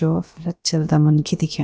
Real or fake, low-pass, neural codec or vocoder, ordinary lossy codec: fake; none; codec, 16 kHz, about 1 kbps, DyCAST, with the encoder's durations; none